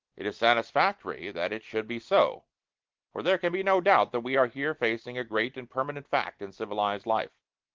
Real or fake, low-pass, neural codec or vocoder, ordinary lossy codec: real; 7.2 kHz; none; Opus, 16 kbps